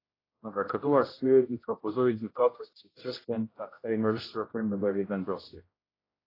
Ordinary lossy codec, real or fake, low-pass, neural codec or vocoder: AAC, 24 kbps; fake; 5.4 kHz; codec, 16 kHz, 0.5 kbps, X-Codec, HuBERT features, trained on general audio